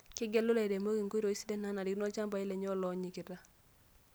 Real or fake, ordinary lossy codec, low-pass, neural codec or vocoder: real; none; none; none